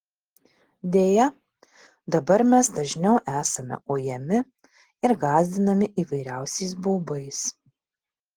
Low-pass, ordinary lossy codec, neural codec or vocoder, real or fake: 19.8 kHz; Opus, 16 kbps; none; real